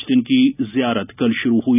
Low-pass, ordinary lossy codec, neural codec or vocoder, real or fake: 3.6 kHz; none; none; real